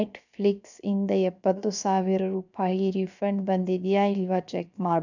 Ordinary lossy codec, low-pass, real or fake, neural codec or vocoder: none; 7.2 kHz; fake; codec, 16 kHz, 0.7 kbps, FocalCodec